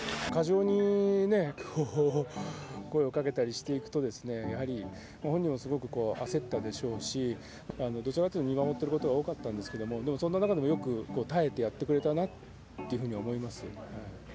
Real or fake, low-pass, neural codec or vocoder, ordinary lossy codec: real; none; none; none